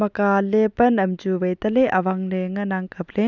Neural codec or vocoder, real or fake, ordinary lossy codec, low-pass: none; real; none; 7.2 kHz